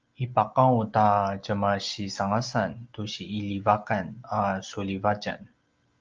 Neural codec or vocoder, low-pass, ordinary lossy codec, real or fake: none; 7.2 kHz; Opus, 24 kbps; real